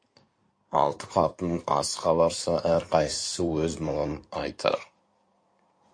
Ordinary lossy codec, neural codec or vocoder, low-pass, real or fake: MP3, 48 kbps; codec, 16 kHz in and 24 kHz out, 2.2 kbps, FireRedTTS-2 codec; 9.9 kHz; fake